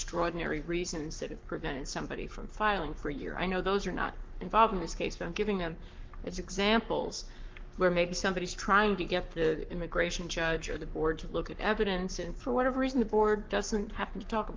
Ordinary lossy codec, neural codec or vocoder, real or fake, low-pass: Opus, 24 kbps; codec, 44.1 kHz, 7.8 kbps, Pupu-Codec; fake; 7.2 kHz